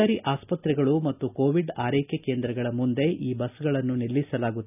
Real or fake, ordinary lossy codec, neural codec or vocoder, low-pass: real; none; none; 3.6 kHz